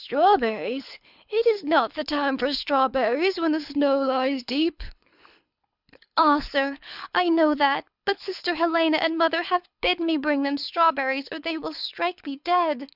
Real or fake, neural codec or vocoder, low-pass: fake; codec, 24 kHz, 6 kbps, HILCodec; 5.4 kHz